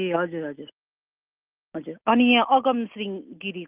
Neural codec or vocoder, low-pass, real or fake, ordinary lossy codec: none; 3.6 kHz; real; Opus, 24 kbps